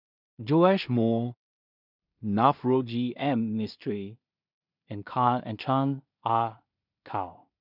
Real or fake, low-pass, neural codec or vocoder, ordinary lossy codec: fake; 5.4 kHz; codec, 16 kHz in and 24 kHz out, 0.4 kbps, LongCat-Audio-Codec, two codebook decoder; none